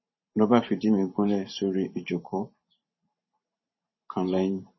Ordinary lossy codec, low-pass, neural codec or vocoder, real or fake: MP3, 24 kbps; 7.2 kHz; vocoder, 44.1 kHz, 128 mel bands every 512 samples, BigVGAN v2; fake